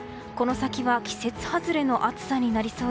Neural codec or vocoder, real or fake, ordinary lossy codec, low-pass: none; real; none; none